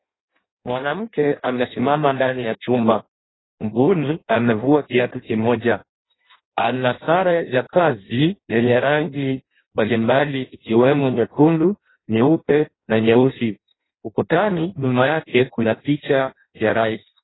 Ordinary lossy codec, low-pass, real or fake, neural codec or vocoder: AAC, 16 kbps; 7.2 kHz; fake; codec, 16 kHz in and 24 kHz out, 0.6 kbps, FireRedTTS-2 codec